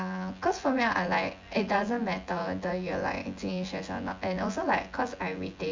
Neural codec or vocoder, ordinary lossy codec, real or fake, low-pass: vocoder, 24 kHz, 100 mel bands, Vocos; MP3, 64 kbps; fake; 7.2 kHz